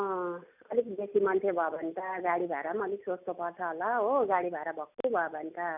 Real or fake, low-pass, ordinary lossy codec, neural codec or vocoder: real; 3.6 kHz; none; none